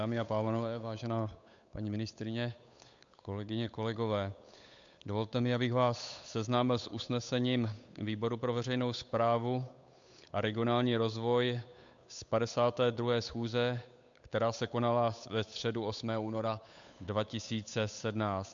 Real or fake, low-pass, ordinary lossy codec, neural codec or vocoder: fake; 7.2 kHz; MP3, 64 kbps; codec, 16 kHz, 8 kbps, FunCodec, trained on Chinese and English, 25 frames a second